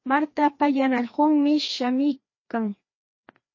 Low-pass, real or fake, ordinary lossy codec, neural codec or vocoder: 7.2 kHz; fake; MP3, 32 kbps; codec, 16 kHz, 2 kbps, FreqCodec, larger model